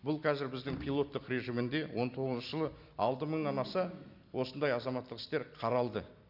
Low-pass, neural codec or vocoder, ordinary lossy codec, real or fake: 5.4 kHz; none; none; real